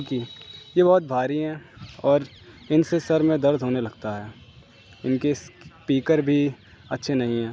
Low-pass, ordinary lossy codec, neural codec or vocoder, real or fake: none; none; none; real